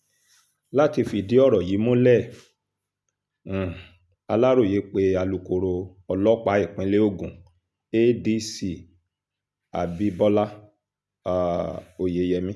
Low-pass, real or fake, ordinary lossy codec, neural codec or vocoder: none; real; none; none